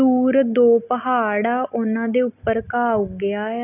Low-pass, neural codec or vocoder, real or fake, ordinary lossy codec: 3.6 kHz; none; real; none